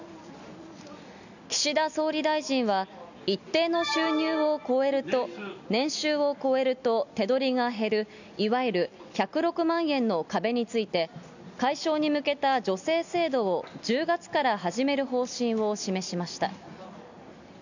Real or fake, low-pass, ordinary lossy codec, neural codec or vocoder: real; 7.2 kHz; none; none